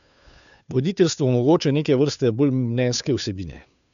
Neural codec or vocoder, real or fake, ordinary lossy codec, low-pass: codec, 16 kHz, 2 kbps, FunCodec, trained on Chinese and English, 25 frames a second; fake; none; 7.2 kHz